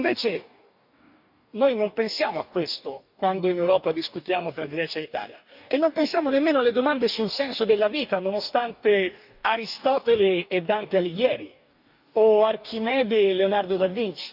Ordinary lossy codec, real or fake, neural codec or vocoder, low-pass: none; fake; codec, 44.1 kHz, 2.6 kbps, DAC; 5.4 kHz